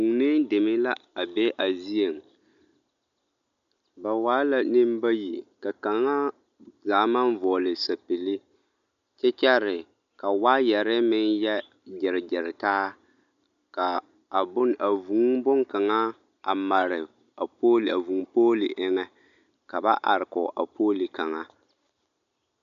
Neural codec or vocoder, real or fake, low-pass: none; real; 7.2 kHz